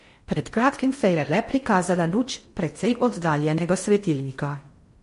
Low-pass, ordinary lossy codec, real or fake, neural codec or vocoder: 10.8 kHz; MP3, 48 kbps; fake; codec, 16 kHz in and 24 kHz out, 0.6 kbps, FocalCodec, streaming, 4096 codes